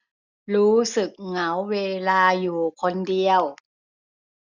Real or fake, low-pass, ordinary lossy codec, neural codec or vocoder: real; 7.2 kHz; none; none